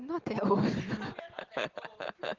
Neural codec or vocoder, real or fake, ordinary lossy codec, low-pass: none; real; Opus, 16 kbps; 7.2 kHz